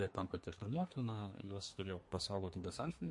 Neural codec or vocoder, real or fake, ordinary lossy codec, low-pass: codec, 24 kHz, 1 kbps, SNAC; fake; MP3, 48 kbps; 10.8 kHz